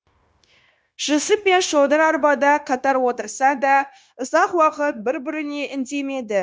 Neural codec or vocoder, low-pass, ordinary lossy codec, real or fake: codec, 16 kHz, 0.9 kbps, LongCat-Audio-Codec; none; none; fake